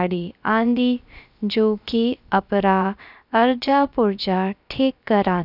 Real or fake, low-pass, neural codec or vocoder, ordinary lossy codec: fake; 5.4 kHz; codec, 16 kHz, about 1 kbps, DyCAST, with the encoder's durations; none